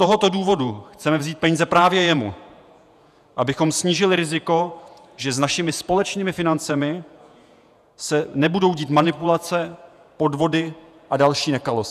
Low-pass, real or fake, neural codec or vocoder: 14.4 kHz; fake; vocoder, 48 kHz, 128 mel bands, Vocos